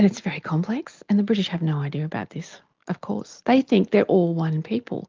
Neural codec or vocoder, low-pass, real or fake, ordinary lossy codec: none; 7.2 kHz; real; Opus, 32 kbps